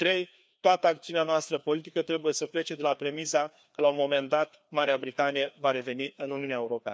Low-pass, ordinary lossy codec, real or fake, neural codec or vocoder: none; none; fake; codec, 16 kHz, 2 kbps, FreqCodec, larger model